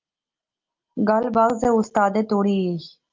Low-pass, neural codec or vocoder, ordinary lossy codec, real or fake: 7.2 kHz; none; Opus, 32 kbps; real